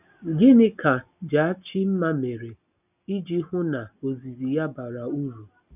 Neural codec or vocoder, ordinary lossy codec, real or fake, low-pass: none; none; real; 3.6 kHz